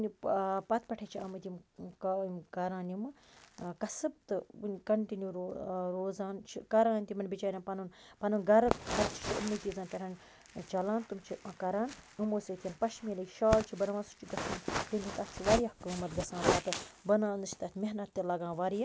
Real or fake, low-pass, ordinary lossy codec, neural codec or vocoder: real; none; none; none